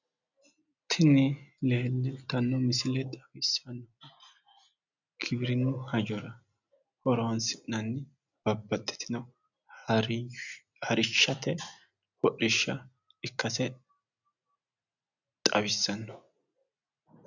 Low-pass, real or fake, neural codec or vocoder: 7.2 kHz; real; none